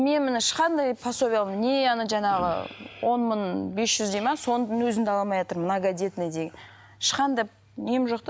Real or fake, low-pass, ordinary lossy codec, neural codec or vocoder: real; none; none; none